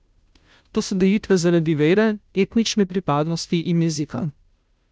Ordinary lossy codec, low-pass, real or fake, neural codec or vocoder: none; none; fake; codec, 16 kHz, 0.5 kbps, FunCodec, trained on Chinese and English, 25 frames a second